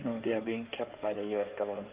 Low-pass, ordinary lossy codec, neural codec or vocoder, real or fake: 3.6 kHz; Opus, 32 kbps; codec, 16 kHz in and 24 kHz out, 2.2 kbps, FireRedTTS-2 codec; fake